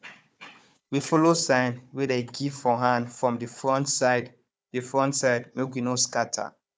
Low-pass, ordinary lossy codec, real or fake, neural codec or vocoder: none; none; fake; codec, 16 kHz, 4 kbps, FunCodec, trained on Chinese and English, 50 frames a second